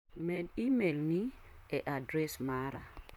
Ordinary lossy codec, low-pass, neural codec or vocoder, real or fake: MP3, 96 kbps; 19.8 kHz; vocoder, 44.1 kHz, 128 mel bands, Pupu-Vocoder; fake